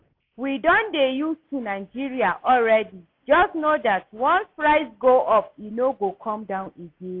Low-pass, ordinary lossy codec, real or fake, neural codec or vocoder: 5.4 kHz; AAC, 32 kbps; real; none